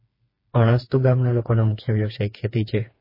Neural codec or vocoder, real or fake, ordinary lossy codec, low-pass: codec, 16 kHz, 4 kbps, FreqCodec, smaller model; fake; MP3, 24 kbps; 5.4 kHz